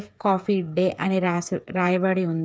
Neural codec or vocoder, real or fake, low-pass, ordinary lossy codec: codec, 16 kHz, 8 kbps, FreqCodec, smaller model; fake; none; none